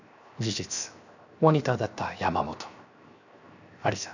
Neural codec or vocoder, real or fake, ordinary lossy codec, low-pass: codec, 16 kHz, 0.7 kbps, FocalCodec; fake; none; 7.2 kHz